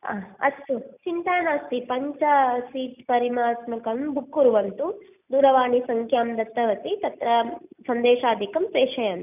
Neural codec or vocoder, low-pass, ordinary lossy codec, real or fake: none; 3.6 kHz; none; real